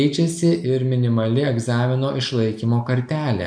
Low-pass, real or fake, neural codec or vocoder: 9.9 kHz; real; none